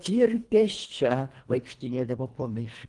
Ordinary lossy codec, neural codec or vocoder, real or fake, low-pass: Opus, 24 kbps; codec, 24 kHz, 1.5 kbps, HILCodec; fake; 10.8 kHz